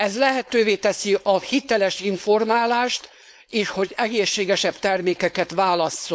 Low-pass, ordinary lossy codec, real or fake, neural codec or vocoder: none; none; fake; codec, 16 kHz, 4.8 kbps, FACodec